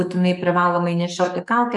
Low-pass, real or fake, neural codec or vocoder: 10.8 kHz; fake; codec, 44.1 kHz, 7.8 kbps, DAC